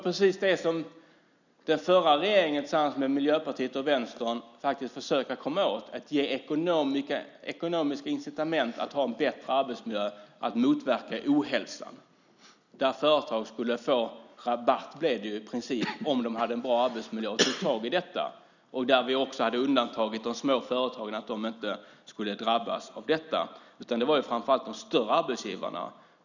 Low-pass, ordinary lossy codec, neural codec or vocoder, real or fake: 7.2 kHz; none; none; real